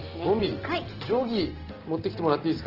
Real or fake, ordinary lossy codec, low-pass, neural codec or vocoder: real; Opus, 16 kbps; 5.4 kHz; none